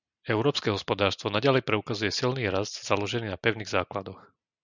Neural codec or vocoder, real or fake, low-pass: none; real; 7.2 kHz